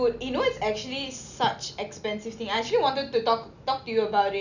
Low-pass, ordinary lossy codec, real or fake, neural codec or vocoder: 7.2 kHz; none; real; none